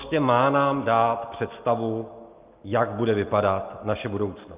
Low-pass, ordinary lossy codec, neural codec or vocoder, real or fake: 3.6 kHz; Opus, 24 kbps; none; real